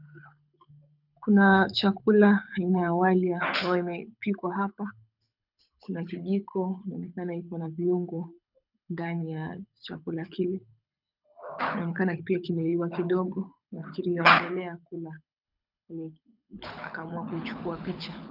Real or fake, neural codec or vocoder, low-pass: fake; codec, 24 kHz, 6 kbps, HILCodec; 5.4 kHz